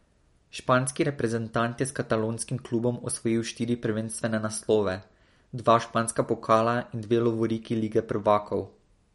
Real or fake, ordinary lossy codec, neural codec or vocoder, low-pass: real; MP3, 48 kbps; none; 19.8 kHz